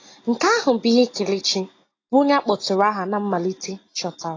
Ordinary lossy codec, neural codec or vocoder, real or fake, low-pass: AAC, 32 kbps; none; real; 7.2 kHz